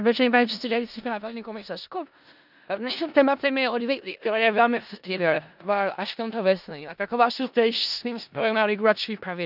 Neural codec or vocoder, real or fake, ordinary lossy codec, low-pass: codec, 16 kHz in and 24 kHz out, 0.4 kbps, LongCat-Audio-Codec, four codebook decoder; fake; none; 5.4 kHz